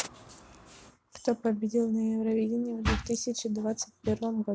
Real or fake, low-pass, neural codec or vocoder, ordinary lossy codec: real; none; none; none